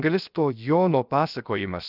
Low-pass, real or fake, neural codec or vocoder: 5.4 kHz; fake; codec, 16 kHz, 0.7 kbps, FocalCodec